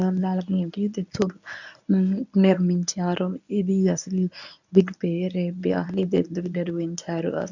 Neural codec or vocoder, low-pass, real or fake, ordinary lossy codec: codec, 24 kHz, 0.9 kbps, WavTokenizer, medium speech release version 1; 7.2 kHz; fake; none